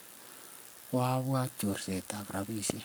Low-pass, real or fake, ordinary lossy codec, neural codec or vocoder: none; fake; none; codec, 44.1 kHz, 7.8 kbps, Pupu-Codec